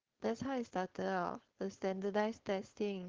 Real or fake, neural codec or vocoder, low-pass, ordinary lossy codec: fake; codec, 16 kHz, 4.8 kbps, FACodec; 7.2 kHz; Opus, 16 kbps